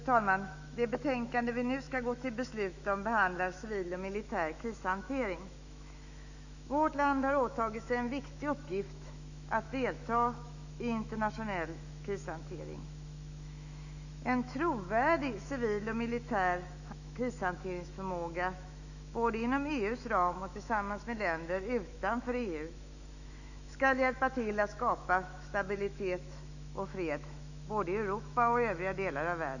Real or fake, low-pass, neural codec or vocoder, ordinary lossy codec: fake; 7.2 kHz; autoencoder, 48 kHz, 128 numbers a frame, DAC-VAE, trained on Japanese speech; none